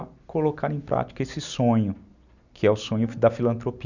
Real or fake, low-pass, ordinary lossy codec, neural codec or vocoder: real; 7.2 kHz; none; none